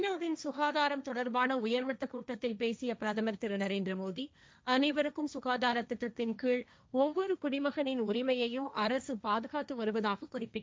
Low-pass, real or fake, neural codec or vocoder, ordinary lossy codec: none; fake; codec, 16 kHz, 1.1 kbps, Voila-Tokenizer; none